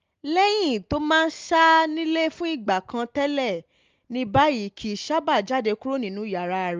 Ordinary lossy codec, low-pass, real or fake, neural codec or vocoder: Opus, 32 kbps; 7.2 kHz; real; none